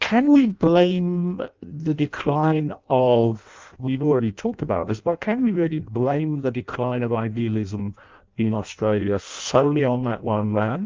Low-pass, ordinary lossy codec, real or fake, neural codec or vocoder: 7.2 kHz; Opus, 32 kbps; fake; codec, 16 kHz in and 24 kHz out, 0.6 kbps, FireRedTTS-2 codec